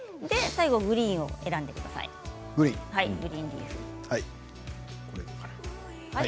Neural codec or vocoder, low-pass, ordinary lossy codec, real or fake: none; none; none; real